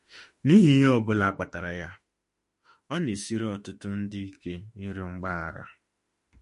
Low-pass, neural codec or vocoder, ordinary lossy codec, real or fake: 14.4 kHz; autoencoder, 48 kHz, 32 numbers a frame, DAC-VAE, trained on Japanese speech; MP3, 48 kbps; fake